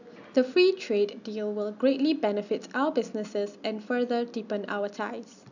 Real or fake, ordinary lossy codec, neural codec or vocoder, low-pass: real; none; none; 7.2 kHz